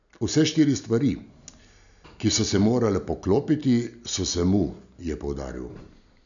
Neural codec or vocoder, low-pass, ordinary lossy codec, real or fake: none; 7.2 kHz; none; real